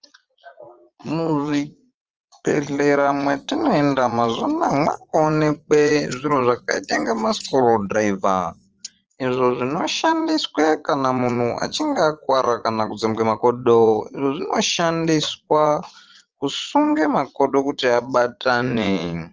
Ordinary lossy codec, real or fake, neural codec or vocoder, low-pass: Opus, 24 kbps; fake; vocoder, 22.05 kHz, 80 mel bands, Vocos; 7.2 kHz